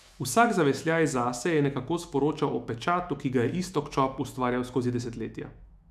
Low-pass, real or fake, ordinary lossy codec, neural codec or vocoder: 14.4 kHz; fake; none; autoencoder, 48 kHz, 128 numbers a frame, DAC-VAE, trained on Japanese speech